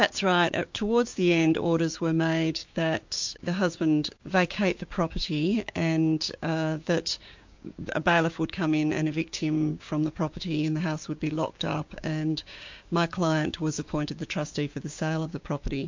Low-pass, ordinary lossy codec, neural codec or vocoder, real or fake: 7.2 kHz; MP3, 48 kbps; codec, 16 kHz, 6 kbps, DAC; fake